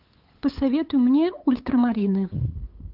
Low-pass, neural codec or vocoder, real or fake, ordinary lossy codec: 5.4 kHz; codec, 16 kHz, 8 kbps, FunCodec, trained on LibriTTS, 25 frames a second; fake; Opus, 24 kbps